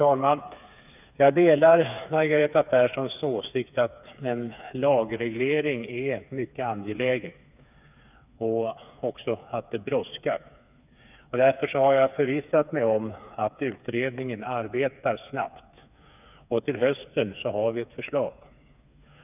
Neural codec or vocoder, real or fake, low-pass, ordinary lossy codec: codec, 16 kHz, 4 kbps, FreqCodec, smaller model; fake; 3.6 kHz; none